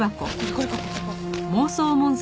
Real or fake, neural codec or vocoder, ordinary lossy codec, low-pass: real; none; none; none